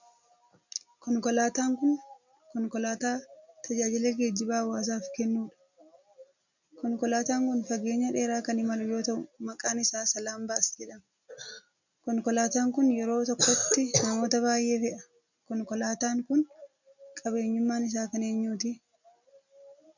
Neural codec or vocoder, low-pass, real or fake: none; 7.2 kHz; real